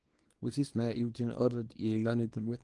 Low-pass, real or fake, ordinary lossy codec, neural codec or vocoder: 10.8 kHz; fake; Opus, 24 kbps; codec, 24 kHz, 0.9 kbps, WavTokenizer, small release